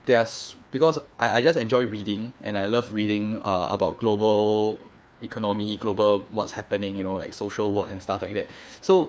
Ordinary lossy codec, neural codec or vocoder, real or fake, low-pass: none; codec, 16 kHz, 2 kbps, FreqCodec, larger model; fake; none